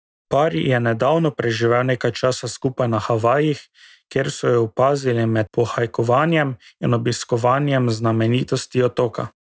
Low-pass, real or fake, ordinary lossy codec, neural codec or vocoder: none; real; none; none